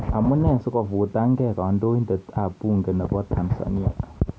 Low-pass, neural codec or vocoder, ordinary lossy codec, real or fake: none; none; none; real